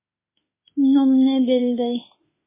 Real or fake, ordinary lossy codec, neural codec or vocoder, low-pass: fake; MP3, 16 kbps; autoencoder, 48 kHz, 32 numbers a frame, DAC-VAE, trained on Japanese speech; 3.6 kHz